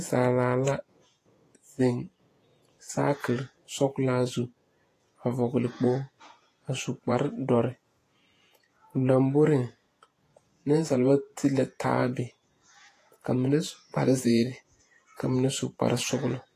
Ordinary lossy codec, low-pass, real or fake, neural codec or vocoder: AAC, 48 kbps; 14.4 kHz; fake; vocoder, 48 kHz, 128 mel bands, Vocos